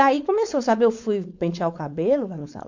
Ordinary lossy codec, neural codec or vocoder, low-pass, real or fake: MP3, 48 kbps; codec, 16 kHz, 4.8 kbps, FACodec; 7.2 kHz; fake